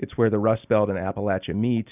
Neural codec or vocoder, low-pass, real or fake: none; 3.6 kHz; real